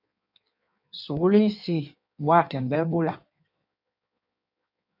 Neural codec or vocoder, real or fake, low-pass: codec, 16 kHz in and 24 kHz out, 1.1 kbps, FireRedTTS-2 codec; fake; 5.4 kHz